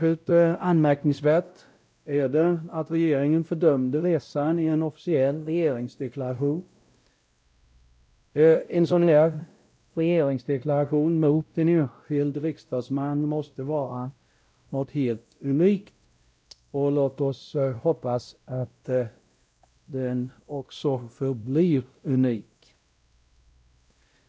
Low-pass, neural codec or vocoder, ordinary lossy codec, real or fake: none; codec, 16 kHz, 0.5 kbps, X-Codec, WavLM features, trained on Multilingual LibriSpeech; none; fake